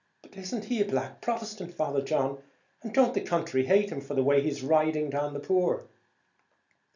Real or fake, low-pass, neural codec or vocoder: real; 7.2 kHz; none